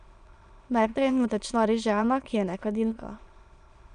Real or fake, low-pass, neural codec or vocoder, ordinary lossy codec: fake; 9.9 kHz; autoencoder, 22.05 kHz, a latent of 192 numbers a frame, VITS, trained on many speakers; Opus, 64 kbps